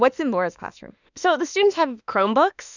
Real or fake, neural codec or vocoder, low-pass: fake; autoencoder, 48 kHz, 32 numbers a frame, DAC-VAE, trained on Japanese speech; 7.2 kHz